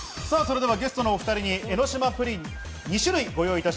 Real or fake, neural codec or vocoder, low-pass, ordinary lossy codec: real; none; none; none